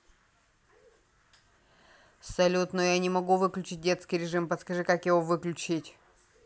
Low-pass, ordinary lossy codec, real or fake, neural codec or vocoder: none; none; real; none